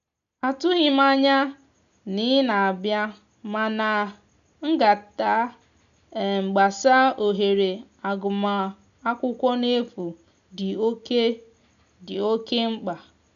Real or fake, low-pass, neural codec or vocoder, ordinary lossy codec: real; 7.2 kHz; none; none